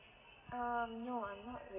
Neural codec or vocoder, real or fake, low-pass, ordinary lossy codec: none; real; 3.6 kHz; none